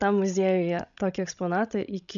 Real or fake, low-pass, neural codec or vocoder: fake; 7.2 kHz; codec, 16 kHz, 16 kbps, FreqCodec, larger model